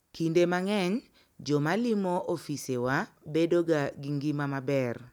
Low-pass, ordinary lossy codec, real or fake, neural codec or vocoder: 19.8 kHz; none; real; none